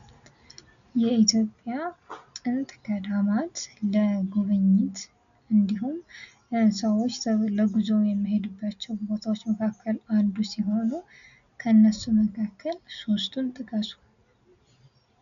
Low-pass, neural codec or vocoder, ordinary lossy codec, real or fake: 7.2 kHz; none; MP3, 96 kbps; real